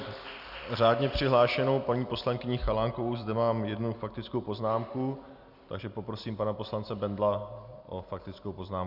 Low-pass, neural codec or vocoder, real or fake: 5.4 kHz; none; real